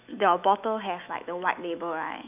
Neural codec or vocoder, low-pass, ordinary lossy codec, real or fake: autoencoder, 48 kHz, 128 numbers a frame, DAC-VAE, trained on Japanese speech; 3.6 kHz; none; fake